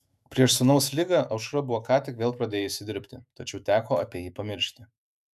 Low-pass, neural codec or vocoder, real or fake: 14.4 kHz; autoencoder, 48 kHz, 128 numbers a frame, DAC-VAE, trained on Japanese speech; fake